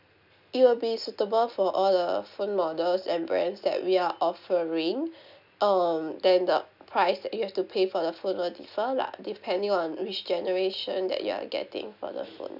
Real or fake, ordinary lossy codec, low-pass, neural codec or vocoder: real; none; 5.4 kHz; none